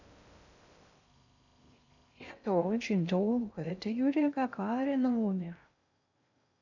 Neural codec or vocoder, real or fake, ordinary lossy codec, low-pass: codec, 16 kHz in and 24 kHz out, 0.6 kbps, FocalCodec, streaming, 2048 codes; fake; none; 7.2 kHz